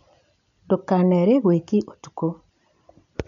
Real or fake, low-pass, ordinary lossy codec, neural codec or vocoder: real; 7.2 kHz; none; none